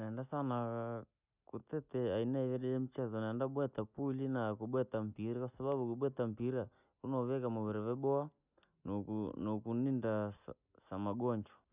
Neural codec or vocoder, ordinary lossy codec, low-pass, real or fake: none; none; 3.6 kHz; real